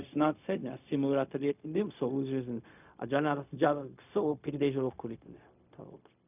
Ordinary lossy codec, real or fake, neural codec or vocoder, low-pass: none; fake; codec, 16 kHz, 0.4 kbps, LongCat-Audio-Codec; 3.6 kHz